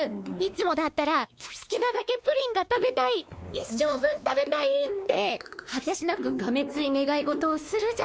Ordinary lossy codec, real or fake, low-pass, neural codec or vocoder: none; fake; none; codec, 16 kHz, 2 kbps, X-Codec, WavLM features, trained on Multilingual LibriSpeech